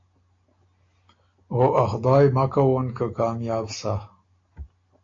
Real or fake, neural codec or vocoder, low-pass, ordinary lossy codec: real; none; 7.2 kHz; AAC, 32 kbps